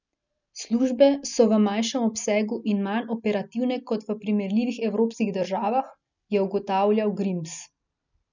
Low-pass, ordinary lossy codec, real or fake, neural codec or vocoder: 7.2 kHz; none; real; none